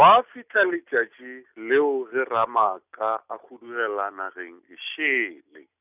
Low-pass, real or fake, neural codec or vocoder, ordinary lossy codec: 3.6 kHz; real; none; none